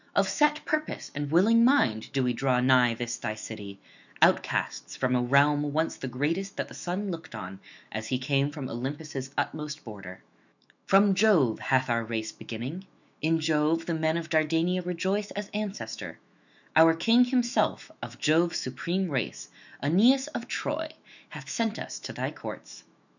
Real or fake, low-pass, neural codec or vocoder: fake; 7.2 kHz; autoencoder, 48 kHz, 128 numbers a frame, DAC-VAE, trained on Japanese speech